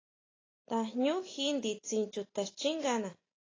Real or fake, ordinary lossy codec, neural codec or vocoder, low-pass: real; AAC, 32 kbps; none; 7.2 kHz